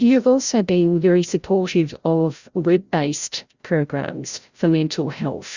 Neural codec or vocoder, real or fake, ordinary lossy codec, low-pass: codec, 16 kHz, 0.5 kbps, FreqCodec, larger model; fake; Opus, 64 kbps; 7.2 kHz